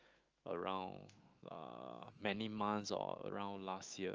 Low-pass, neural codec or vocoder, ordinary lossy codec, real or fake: 7.2 kHz; autoencoder, 48 kHz, 128 numbers a frame, DAC-VAE, trained on Japanese speech; Opus, 24 kbps; fake